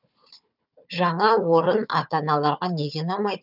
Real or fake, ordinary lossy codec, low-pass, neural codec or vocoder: fake; none; 5.4 kHz; codec, 16 kHz, 4 kbps, FunCodec, trained on Chinese and English, 50 frames a second